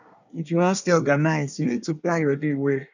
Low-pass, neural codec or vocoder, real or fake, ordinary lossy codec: 7.2 kHz; codec, 24 kHz, 1 kbps, SNAC; fake; none